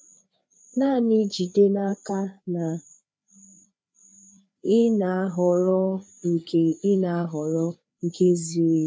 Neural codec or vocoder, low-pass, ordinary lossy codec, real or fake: codec, 16 kHz, 4 kbps, FreqCodec, larger model; none; none; fake